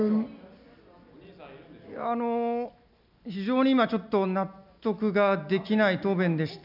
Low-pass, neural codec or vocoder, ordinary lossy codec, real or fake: 5.4 kHz; none; none; real